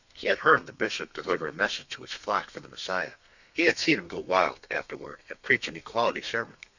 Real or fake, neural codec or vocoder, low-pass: fake; codec, 32 kHz, 1.9 kbps, SNAC; 7.2 kHz